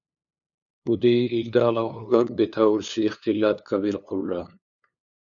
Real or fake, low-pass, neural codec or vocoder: fake; 7.2 kHz; codec, 16 kHz, 2 kbps, FunCodec, trained on LibriTTS, 25 frames a second